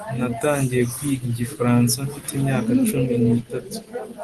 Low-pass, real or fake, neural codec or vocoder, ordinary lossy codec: 10.8 kHz; real; none; Opus, 16 kbps